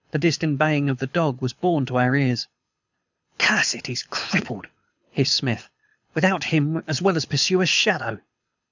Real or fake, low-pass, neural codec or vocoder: fake; 7.2 kHz; codec, 24 kHz, 6 kbps, HILCodec